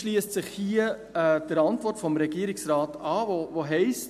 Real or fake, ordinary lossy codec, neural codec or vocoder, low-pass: real; MP3, 64 kbps; none; 14.4 kHz